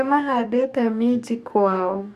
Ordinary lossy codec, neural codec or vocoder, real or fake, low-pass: none; codec, 44.1 kHz, 2.6 kbps, DAC; fake; 14.4 kHz